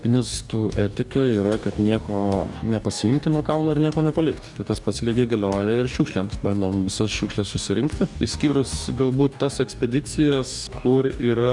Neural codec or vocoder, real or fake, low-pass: codec, 44.1 kHz, 2.6 kbps, DAC; fake; 10.8 kHz